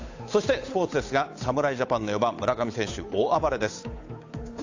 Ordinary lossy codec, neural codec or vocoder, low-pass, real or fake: AAC, 48 kbps; codec, 16 kHz, 8 kbps, FunCodec, trained on Chinese and English, 25 frames a second; 7.2 kHz; fake